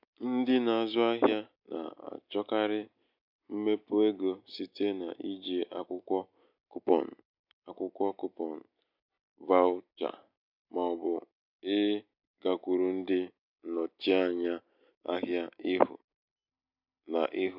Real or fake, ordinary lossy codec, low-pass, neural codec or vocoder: real; MP3, 48 kbps; 5.4 kHz; none